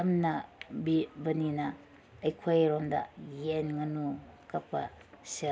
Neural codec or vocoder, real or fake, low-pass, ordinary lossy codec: none; real; none; none